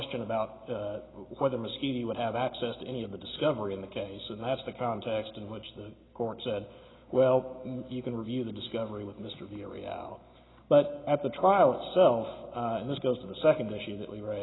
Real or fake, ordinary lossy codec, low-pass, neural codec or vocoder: real; AAC, 16 kbps; 7.2 kHz; none